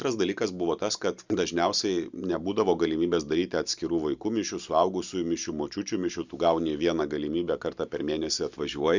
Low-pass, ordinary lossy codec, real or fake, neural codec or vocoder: 7.2 kHz; Opus, 64 kbps; real; none